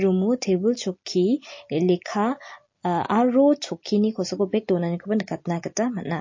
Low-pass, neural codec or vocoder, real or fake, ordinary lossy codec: 7.2 kHz; none; real; MP3, 32 kbps